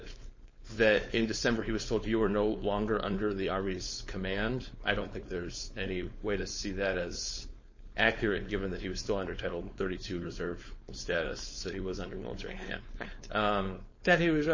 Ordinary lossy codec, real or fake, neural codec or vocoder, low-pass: MP3, 32 kbps; fake; codec, 16 kHz, 4.8 kbps, FACodec; 7.2 kHz